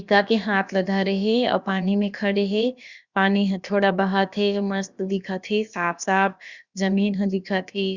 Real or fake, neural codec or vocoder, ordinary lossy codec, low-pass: fake; codec, 16 kHz, about 1 kbps, DyCAST, with the encoder's durations; Opus, 64 kbps; 7.2 kHz